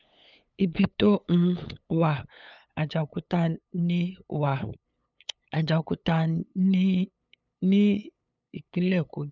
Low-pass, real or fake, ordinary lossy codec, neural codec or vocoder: 7.2 kHz; fake; none; codec, 16 kHz, 8 kbps, FunCodec, trained on LibriTTS, 25 frames a second